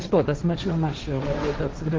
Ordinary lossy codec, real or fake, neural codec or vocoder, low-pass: Opus, 16 kbps; fake; codec, 16 kHz, 1.1 kbps, Voila-Tokenizer; 7.2 kHz